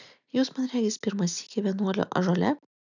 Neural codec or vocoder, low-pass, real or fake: none; 7.2 kHz; real